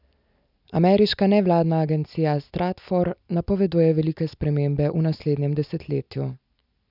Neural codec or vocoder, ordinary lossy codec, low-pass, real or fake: none; none; 5.4 kHz; real